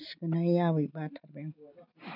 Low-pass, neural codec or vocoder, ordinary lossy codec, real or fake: 5.4 kHz; none; none; real